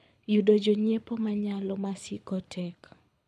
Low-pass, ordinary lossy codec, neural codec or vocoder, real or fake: none; none; codec, 24 kHz, 6 kbps, HILCodec; fake